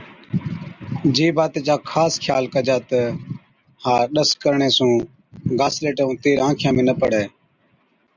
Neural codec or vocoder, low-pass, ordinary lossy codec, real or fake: none; 7.2 kHz; Opus, 64 kbps; real